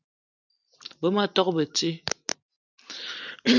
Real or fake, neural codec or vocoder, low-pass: real; none; 7.2 kHz